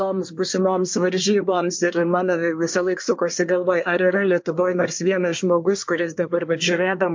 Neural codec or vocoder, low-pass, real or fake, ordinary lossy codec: codec, 24 kHz, 1 kbps, SNAC; 7.2 kHz; fake; MP3, 48 kbps